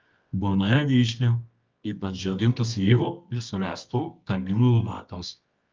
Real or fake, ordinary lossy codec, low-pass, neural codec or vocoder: fake; Opus, 24 kbps; 7.2 kHz; codec, 24 kHz, 0.9 kbps, WavTokenizer, medium music audio release